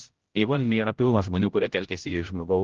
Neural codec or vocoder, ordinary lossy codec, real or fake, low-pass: codec, 16 kHz, 0.5 kbps, X-Codec, HuBERT features, trained on general audio; Opus, 16 kbps; fake; 7.2 kHz